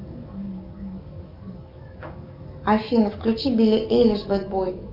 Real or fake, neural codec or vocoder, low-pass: fake; codec, 44.1 kHz, 7.8 kbps, DAC; 5.4 kHz